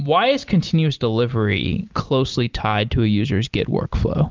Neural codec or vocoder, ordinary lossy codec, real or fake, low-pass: none; Opus, 32 kbps; real; 7.2 kHz